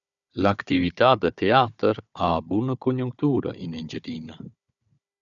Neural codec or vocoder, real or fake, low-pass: codec, 16 kHz, 4 kbps, FunCodec, trained on Chinese and English, 50 frames a second; fake; 7.2 kHz